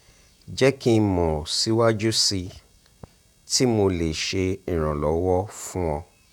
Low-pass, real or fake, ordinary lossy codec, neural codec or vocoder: 19.8 kHz; fake; none; vocoder, 48 kHz, 128 mel bands, Vocos